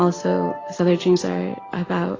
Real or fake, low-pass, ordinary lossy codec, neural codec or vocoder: real; 7.2 kHz; AAC, 32 kbps; none